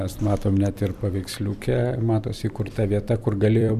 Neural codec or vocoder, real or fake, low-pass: vocoder, 44.1 kHz, 128 mel bands every 256 samples, BigVGAN v2; fake; 14.4 kHz